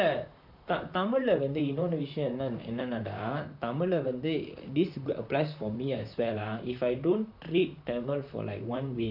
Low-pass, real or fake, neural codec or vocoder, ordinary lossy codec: 5.4 kHz; fake; vocoder, 44.1 kHz, 128 mel bands, Pupu-Vocoder; none